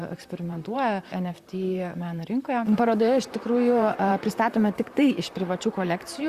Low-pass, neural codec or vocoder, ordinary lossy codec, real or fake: 14.4 kHz; vocoder, 44.1 kHz, 128 mel bands, Pupu-Vocoder; Opus, 64 kbps; fake